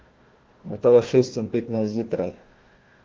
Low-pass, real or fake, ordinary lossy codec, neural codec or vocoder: 7.2 kHz; fake; Opus, 16 kbps; codec, 16 kHz, 1 kbps, FunCodec, trained on Chinese and English, 50 frames a second